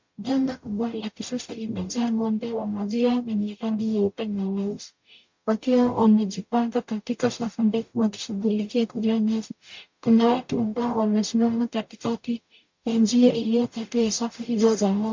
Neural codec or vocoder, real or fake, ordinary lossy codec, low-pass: codec, 44.1 kHz, 0.9 kbps, DAC; fake; MP3, 48 kbps; 7.2 kHz